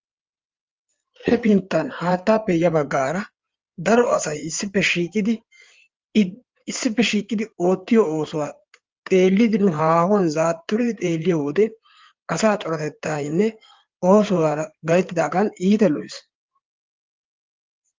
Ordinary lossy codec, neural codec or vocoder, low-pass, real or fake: Opus, 32 kbps; codec, 16 kHz in and 24 kHz out, 2.2 kbps, FireRedTTS-2 codec; 7.2 kHz; fake